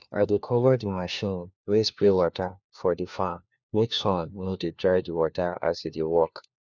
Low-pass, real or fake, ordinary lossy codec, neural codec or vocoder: 7.2 kHz; fake; none; codec, 16 kHz, 1 kbps, FunCodec, trained on LibriTTS, 50 frames a second